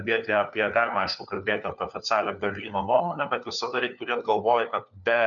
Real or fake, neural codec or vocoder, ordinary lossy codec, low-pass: fake; codec, 16 kHz, 4 kbps, FunCodec, trained on LibriTTS, 50 frames a second; MP3, 96 kbps; 7.2 kHz